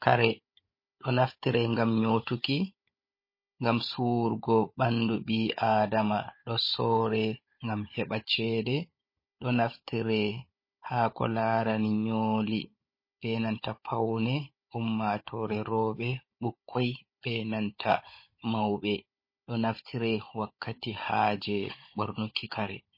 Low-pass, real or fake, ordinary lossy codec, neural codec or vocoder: 5.4 kHz; fake; MP3, 24 kbps; codec, 16 kHz, 16 kbps, FunCodec, trained on Chinese and English, 50 frames a second